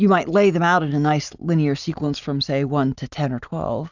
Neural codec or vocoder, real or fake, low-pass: none; real; 7.2 kHz